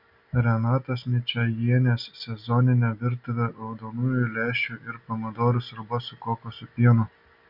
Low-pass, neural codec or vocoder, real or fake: 5.4 kHz; none; real